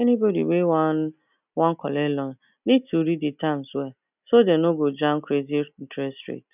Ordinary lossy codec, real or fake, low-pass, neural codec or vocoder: none; real; 3.6 kHz; none